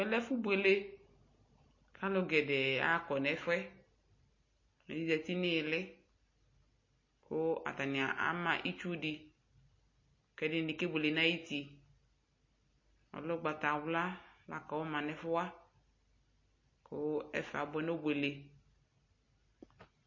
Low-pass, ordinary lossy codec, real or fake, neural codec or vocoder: 7.2 kHz; MP3, 32 kbps; real; none